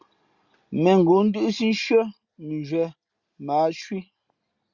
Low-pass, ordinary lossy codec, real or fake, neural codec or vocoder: 7.2 kHz; Opus, 64 kbps; real; none